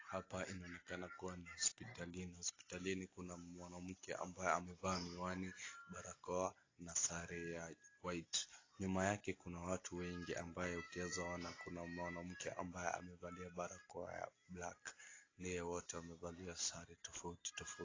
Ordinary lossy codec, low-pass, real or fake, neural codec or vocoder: AAC, 32 kbps; 7.2 kHz; real; none